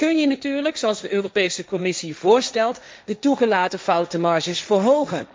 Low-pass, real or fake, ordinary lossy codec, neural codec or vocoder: none; fake; none; codec, 16 kHz, 1.1 kbps, Voila-Tokenizer